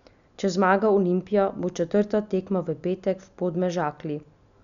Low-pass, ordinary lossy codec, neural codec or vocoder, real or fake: 7.2 kHz; none; none; real